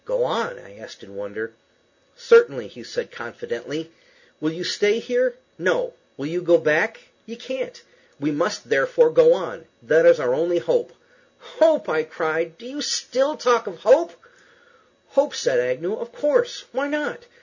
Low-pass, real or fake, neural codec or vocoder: 7.2 kHz; real; none